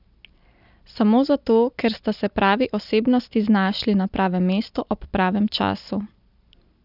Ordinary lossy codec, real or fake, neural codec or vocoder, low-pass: none; real; none; 5.4 kHz